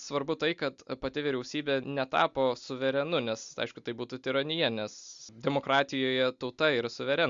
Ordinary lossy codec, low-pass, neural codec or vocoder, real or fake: Opus, 64 kbps; 7.2 kHz; none; real